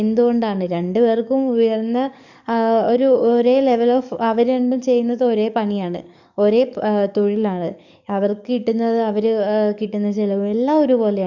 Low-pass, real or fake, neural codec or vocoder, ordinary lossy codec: 7.2 kHz; fake; codec, 44.1 kHz, 7.8 kbps, DAC; none